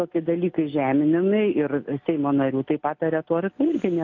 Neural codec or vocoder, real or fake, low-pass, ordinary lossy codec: none; real; 7.2 kHz; AAC, 48 kbps